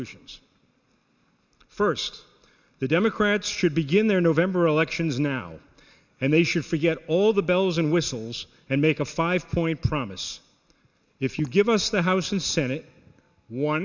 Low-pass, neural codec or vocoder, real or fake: 7.2 kHz; none; real